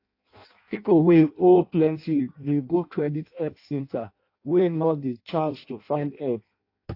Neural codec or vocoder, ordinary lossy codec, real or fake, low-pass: codec, 16 kHz in and 24 kHz out, 0.6 kbps, FireRedTTS-2 codec; AAC, 32 kbps; fake; 5.4 kHz